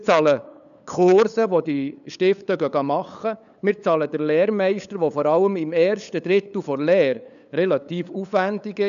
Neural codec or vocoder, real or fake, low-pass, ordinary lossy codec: codec, 16 kHz, 8 kbps, FunCodec, trained on LibriTTS, 25 frames a second; fake; 7.2 kHz; none